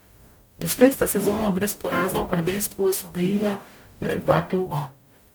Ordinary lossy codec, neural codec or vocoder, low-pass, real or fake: none; codec, 44.1 kHz, 0.9 kbps, DAC; none; fake